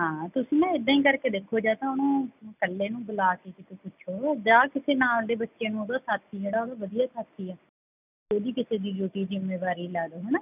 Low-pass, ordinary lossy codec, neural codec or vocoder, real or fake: 3.6 kHz; none; none; real